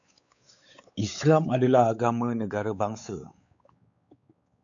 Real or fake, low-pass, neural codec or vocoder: fake; 7.2 kHz; codec, 16 kHz, 4 kbps, X-Codec, WavLM features, trained on Multilingual LibriSpeech